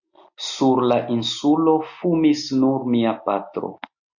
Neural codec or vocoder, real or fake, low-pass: none; real; 7.2 kHz